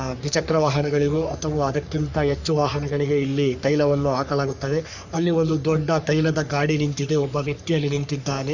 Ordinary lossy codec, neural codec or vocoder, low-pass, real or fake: none; codec, 44.1 kHz, 3.4 kbps, Pupu-Codec; 7.2 kHz; fake